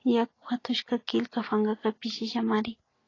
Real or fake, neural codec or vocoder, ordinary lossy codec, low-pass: real; none; AAC, 32 kbps; 7.2 kHz